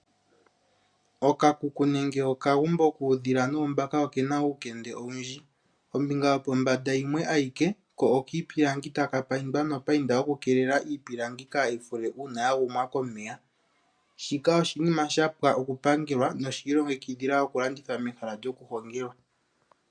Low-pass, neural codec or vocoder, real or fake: 9.9 kHz; none; real